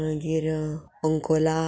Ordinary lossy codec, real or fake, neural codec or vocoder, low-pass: none; real; none; none